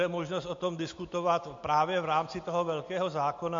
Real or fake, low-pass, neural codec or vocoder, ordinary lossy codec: real; 7.2 kHz; none; MP3, 48 kbps